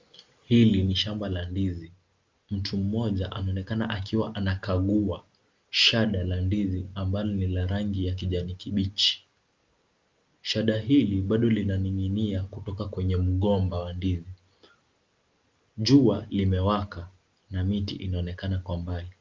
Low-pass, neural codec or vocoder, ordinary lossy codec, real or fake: 7.2 kHz; none; Opus, 32 kbps; real